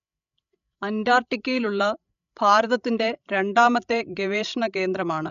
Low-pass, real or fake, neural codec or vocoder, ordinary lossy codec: 7.2 kHz; fake; codec, 16 kHz, 16 kbps, FreqCodec, larger model; none